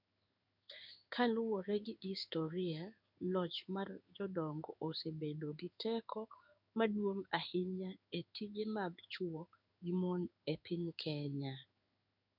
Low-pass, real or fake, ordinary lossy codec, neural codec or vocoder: 5.4 kHz; fake; none; codec, 16 kHz in and 24 kHz out, 1 kbps, XY-Tokenizer